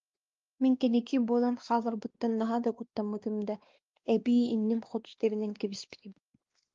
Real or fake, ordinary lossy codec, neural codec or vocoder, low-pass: fake; Opus, 32 kbps; codec, 16 kHz, 2 kbps, X-Codec, WavLM features, trained on Multilingual LibriSpeech; 7.2 kHz